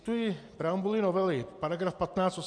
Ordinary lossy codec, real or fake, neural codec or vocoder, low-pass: MP3, 64 kbps; real; none; 9.9 kHz